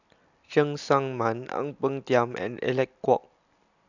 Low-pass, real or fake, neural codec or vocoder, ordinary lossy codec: 7.2 kHz; real; none; none